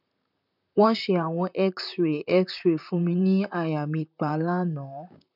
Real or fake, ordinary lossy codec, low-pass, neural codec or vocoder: fake; none; 5.4 kHz; vocoder, 44.1 kHz, 128 mel bands, Pupu-Vocoder